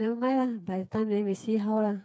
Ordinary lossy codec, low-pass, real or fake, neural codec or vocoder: none; none; fake; codec, 16 kHz, 4 kbps, FreqCodec, smaller model